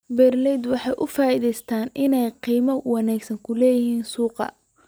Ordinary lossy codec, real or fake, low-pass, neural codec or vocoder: none; real; none; none